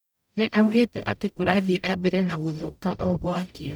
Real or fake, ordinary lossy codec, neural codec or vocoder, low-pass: fake; none; codec, 44.1 kHz, 0.9 kbps, DAC; none